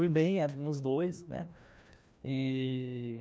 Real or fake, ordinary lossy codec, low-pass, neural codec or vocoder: fake; none; none; codec, 16 kHz, 1 kbps, FreqCodec, larger model